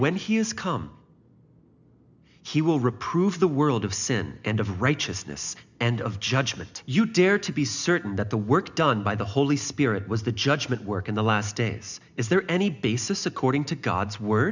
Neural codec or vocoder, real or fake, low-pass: none; real; 7.2 kHz